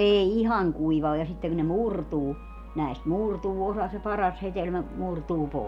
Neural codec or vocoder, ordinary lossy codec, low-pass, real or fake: none; none; 19.8 kHz; real